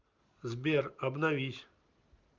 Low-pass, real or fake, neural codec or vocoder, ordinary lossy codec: 7.2 kHz; real; none; Opus, 32 kbps